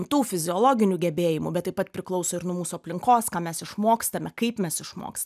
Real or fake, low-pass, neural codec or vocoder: real; 14.4 kHz; none